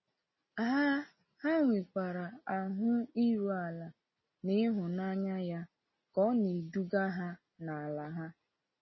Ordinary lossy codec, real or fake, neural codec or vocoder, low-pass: MP3, 24 kbps; real; none; 7.2 kHz